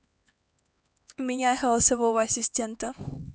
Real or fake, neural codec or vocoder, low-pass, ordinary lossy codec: fake; codec, 16 kHz, 4 kbps, X-Codec, HuBERT features, trained on LibriSpeech; none; none